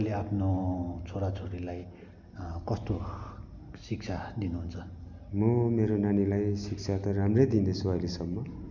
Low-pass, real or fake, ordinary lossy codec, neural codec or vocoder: 7.2 kHz; real; none; none